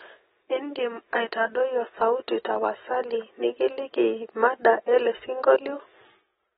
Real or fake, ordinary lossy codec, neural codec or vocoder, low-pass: real; AAC, 16 kbps; none; 7.2 kHz